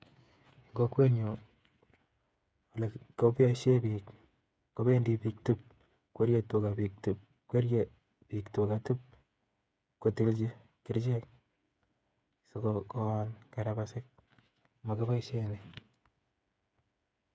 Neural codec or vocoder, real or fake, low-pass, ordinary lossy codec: codec, 16 kHz, 8 kbps, FreqCodec, smaller model; fake; none; none